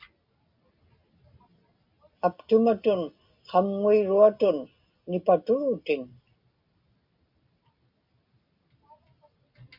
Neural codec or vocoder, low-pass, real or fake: none; 5.4 kHz; real